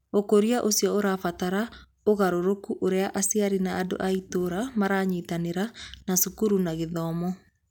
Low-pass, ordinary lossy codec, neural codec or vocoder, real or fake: 19.8 kHz; none; none; real